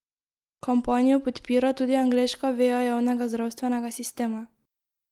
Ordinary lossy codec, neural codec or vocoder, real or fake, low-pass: Opus, 24 kbps; none; real; 19.8 kHz